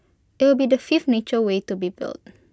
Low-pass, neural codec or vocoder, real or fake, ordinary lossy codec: none; none; real; none